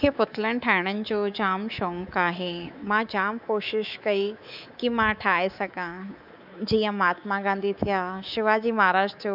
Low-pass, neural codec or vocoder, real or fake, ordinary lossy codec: 5.4 kHz; codec, 24 kHz, 3.1 kbps, DualCodec; fake; none